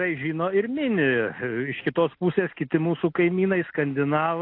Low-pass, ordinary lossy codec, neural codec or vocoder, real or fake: 5.4 kHz; AAC, 32 kbps; none; real